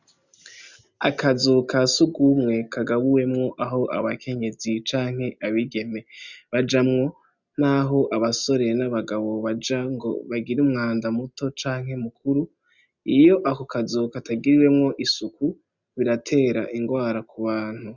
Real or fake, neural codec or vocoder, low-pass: real; none; 7.2 kHz